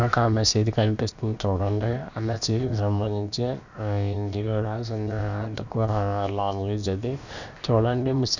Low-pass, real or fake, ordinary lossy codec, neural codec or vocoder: 7.2 kHz; fake; none; codec, 16 kHz, about 1 kbps, DyCAST, with the encoder's durations